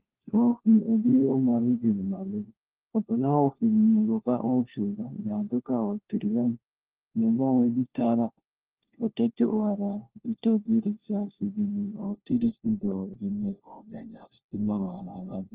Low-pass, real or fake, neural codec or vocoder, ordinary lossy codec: 3.6 kHz; fake; codec, 16 kHz, 1 kbps, FunCodec, trained on LibriTTS, 50 frames a second; Opus, 16 kbps